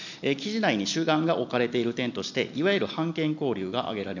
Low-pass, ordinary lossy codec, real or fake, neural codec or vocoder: 7.2 kHz; none; real; none